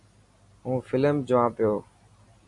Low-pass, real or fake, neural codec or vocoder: 10.8 kHz; real; none